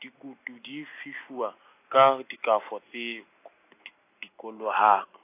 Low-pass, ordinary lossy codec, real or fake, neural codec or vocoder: 3.6 kHz; AAC, 24 kbps; real; none